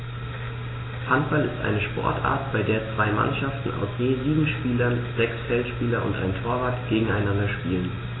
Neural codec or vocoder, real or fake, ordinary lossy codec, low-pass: none; real; AAC, 16 kbps; 7.2 kHz